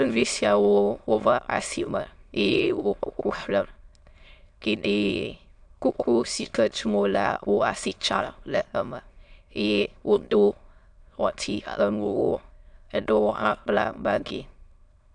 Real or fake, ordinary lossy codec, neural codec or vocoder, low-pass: fake; AAC, 64 kbps; autoencoder, 22.05 kHz, a latent of 192 numbers a frame, VITS, trained on many speakers; 9.9 kHz